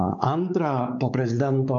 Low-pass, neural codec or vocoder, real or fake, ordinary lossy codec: 7.2 kHz; codec, 16 kHz, 4 kbps, X-Codec, WavLM features, trained on Multilingual LibriSpeech; fake; AAC, 64 kbps